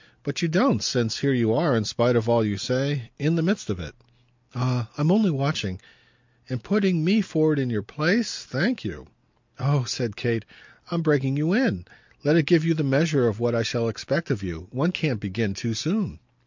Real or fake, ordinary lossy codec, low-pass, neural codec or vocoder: real; MP3, 64 kbps; 7.2 kHz; none